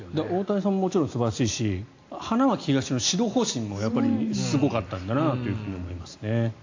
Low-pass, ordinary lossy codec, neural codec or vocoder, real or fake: 7.2 kHz; AAC, 48 kbps; none; real